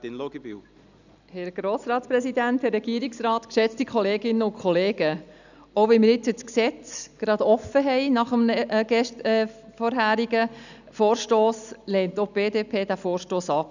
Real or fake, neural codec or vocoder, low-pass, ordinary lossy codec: real; none; 7.2 kHz; none